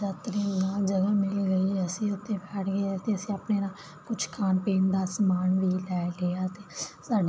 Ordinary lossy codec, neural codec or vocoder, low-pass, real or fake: none; none; none; real